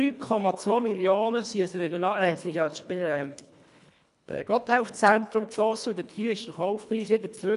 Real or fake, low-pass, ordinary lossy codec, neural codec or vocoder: fake; 10.8 kHz; none; codec, 24 kHz, 1.5 kbps, HILCodec